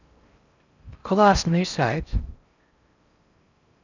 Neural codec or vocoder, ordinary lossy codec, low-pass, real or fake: codec, 16 kHz in and 24 kHz out, 0.6 kbps, FocalCodec, streaming, 4096 codes; none; 7.2 kHz; fake